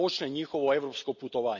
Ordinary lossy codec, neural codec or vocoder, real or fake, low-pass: none; none; real; 7.2 kHz